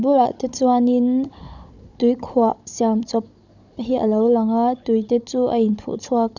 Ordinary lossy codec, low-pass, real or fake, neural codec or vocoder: none; 7.2 kHz; fake; codec, 16 kHz, 16 kbps, FunCodec, trained on Chinese and English, 50 frames a second